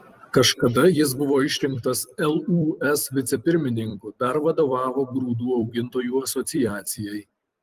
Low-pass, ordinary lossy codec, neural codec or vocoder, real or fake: 14.4 kHz; Opus, 24 kbps; vocoder, 44.1 kHz, 128 mel bands every 256 samples, BigVGAN v2; fake